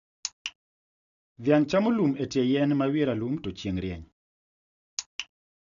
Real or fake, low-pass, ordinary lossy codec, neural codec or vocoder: real; 7.2 kHz; none; none